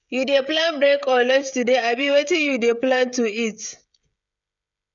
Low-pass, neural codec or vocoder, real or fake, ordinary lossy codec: 7.2 kHz; codec, 16 kHz, 16 kbps, FreqCodec, smaller model; fake; none